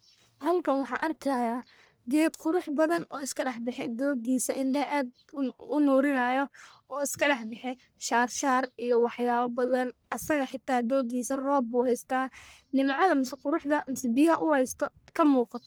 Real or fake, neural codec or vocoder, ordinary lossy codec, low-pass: fake; codec, 44.1 kHz, 1.7 kbps, Pupu-Codec; none; none